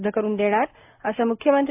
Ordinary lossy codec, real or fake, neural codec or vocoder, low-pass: AAC, 32 kbps; real; none; 3.6 kHz